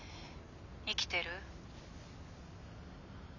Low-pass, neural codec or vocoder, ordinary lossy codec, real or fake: 7.2 kHz; none; none; real